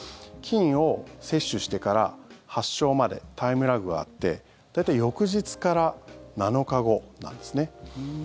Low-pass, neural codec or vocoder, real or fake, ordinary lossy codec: none; none; real; none